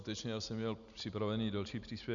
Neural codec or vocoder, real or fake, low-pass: none; real; 7.2 kHz